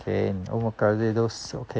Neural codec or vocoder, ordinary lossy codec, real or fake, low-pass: none; none; real; none